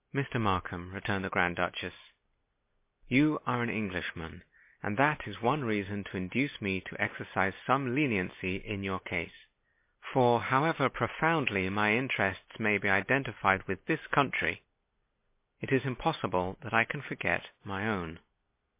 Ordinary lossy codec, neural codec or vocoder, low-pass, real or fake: MP3, 24 kbps; none; 3.6 kHz; real